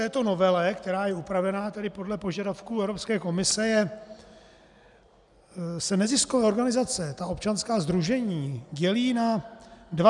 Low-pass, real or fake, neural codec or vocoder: 10.8 kHz; real; none